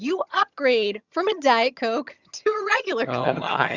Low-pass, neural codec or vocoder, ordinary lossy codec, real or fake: 7.2 kHz; vocoder, 22.05 kHz, 80 mel bands, HiFi-GAN; Opus, 64 kbps; fake